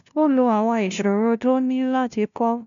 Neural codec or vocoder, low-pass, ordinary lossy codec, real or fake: codec, 16 kHz, 0.5 kbps, FunCodec, trained on LibriTTS, 25 frames a second; 7.2 kHz; none; fake